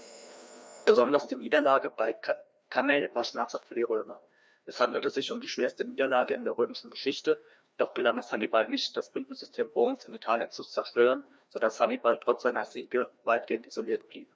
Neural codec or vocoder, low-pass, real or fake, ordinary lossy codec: codec, 16 kHz, 1 kbps, FreqCodec, larger model; none; fake; none